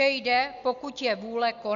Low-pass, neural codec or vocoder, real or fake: 7.2 kHz; none; real